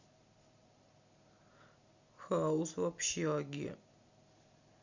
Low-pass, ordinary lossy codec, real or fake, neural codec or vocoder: 7.2 kHz; Opus, 64 kbps; real; none